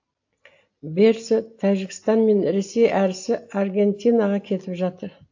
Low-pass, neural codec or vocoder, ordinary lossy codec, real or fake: 7.2 kHz; none; AAC, 48 kbps; real